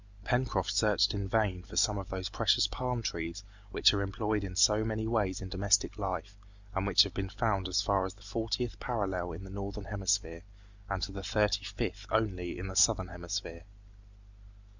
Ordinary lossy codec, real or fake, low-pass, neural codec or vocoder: Opus, 64 kbps; real; 7.2 kHz; none